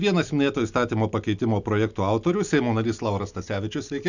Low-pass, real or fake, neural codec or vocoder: 7.2 kHz; real; none